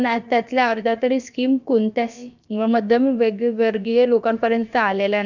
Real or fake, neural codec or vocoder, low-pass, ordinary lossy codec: fake; codec, 16 kHz, about 1 kbps, DyCAST, with the encoder's durations; 7.2 kHz; none